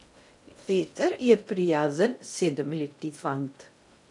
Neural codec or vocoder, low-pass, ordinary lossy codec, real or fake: codec, 16 kHz in and 24 kHz out, 0.6 kbps, FocalCodec, streaming, 2048 codes; 10.8 kHz; none; fake